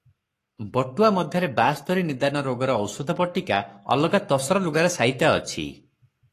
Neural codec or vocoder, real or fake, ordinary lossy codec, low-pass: codec, 44.1 kHz, 7.8 kbps, Pupu-Codec; fake; AAC, 48 kbps; 14.4 kHz